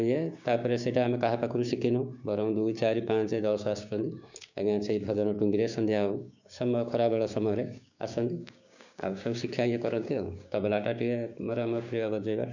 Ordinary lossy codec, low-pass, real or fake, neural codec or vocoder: none; 7.2 kHz; fake; codec, 16 kHz, 6 kbps, DAC